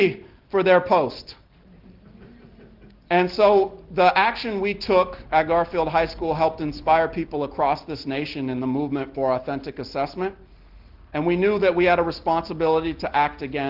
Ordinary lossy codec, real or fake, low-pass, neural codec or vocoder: Opus, 16 kbps; real; 5.4 kHz; none